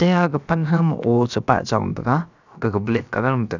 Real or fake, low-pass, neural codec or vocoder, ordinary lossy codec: fake; 7.2 kHz; codec, 16 kHz, about 1 kbps, DyCAST, with the encoder's durations; none